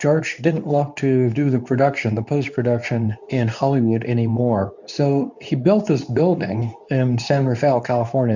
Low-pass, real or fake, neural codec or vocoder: 7.2 kHz; fake; codec, 24 kHz, 0.9 kbps, WavTokenizer, medium speech release version 2